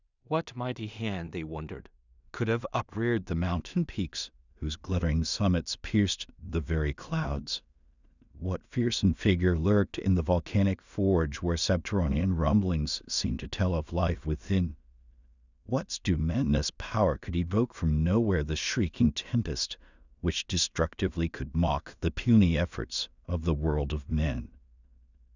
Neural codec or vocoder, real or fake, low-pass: codec, 16 kHz in and 24 kHz out, 0.4 kbps, LongCat-Audio-Codec, two codebook decoder; fake; 7.2 kHz